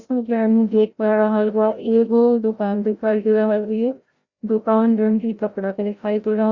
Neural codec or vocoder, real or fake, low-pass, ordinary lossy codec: codec, 16 kHz, 0.5 kbps, FreqCodec, larger model; fake; 7.2 kHz; Opus, 64 kbps